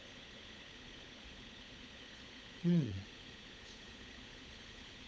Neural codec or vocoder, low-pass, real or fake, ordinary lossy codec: codec, 16 kHz, 16 kbps, FunCodec, trained on LibriTTS, 50 frames a second; none; fake; none